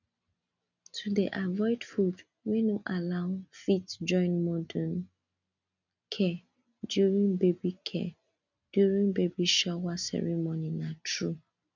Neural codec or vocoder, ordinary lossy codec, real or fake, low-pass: none; none; real; 7.2 kHz